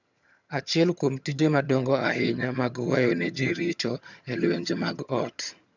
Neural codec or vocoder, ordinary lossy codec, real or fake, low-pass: vocoder, 22.05 kHz, 80 mel bands, HiFi-GAN; none; fake; 7.2 kHz